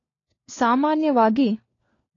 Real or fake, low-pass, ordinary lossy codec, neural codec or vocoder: fake; 7.2 kHz; AAC, 32 kbps; codec, 16 kHz, 4 kbps, FunCodec, trained on LibriTTS, 50 frames a second